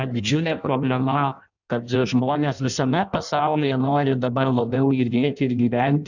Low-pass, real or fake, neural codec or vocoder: 7.2 kHz; fake; codec, 16 kHz in and 24 kHz out, 0.6 kbps, FireRedTTS-2 codec